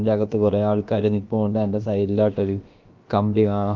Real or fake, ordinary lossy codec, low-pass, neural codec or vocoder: fake; Opus, 32 kbps; 7.2 kHz; codec, 16 kHz, about 1 kbps, DyCAST, with the encoder's durations